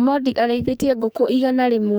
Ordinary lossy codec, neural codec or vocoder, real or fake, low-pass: none; codec, 44.1 kHz, 2.6 kbps, SNAC; fake; none